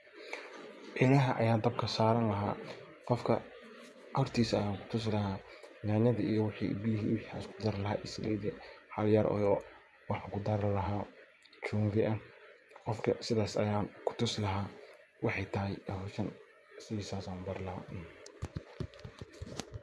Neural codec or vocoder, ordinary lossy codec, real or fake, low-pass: vocoder, 24 kHz, 100 mel bands, Vocos; none; fake; none